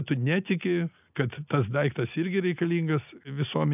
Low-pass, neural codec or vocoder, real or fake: 3.6 kHz; none; real